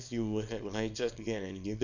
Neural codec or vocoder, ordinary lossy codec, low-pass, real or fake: codec, 24 kHz, 0.9 kbps, WavTokenizer, small release; none; 7.2 kHz; fake